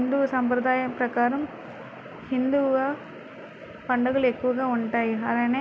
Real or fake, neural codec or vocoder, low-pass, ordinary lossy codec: real; none; none; none